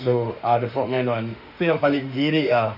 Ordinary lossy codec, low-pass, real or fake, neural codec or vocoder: none; 5.4 kHz; fake; autoencoder, 48 kHz, 32 numbers a frame, DAC-VAE, trained on Japanese speech